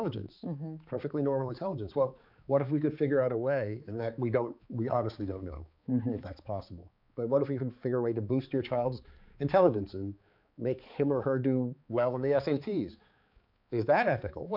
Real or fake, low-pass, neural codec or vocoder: fake; 5.4 kHz; codec, 16 kHz, 4 kbps, X-Codec, HuBERT features, trained on balanced general audio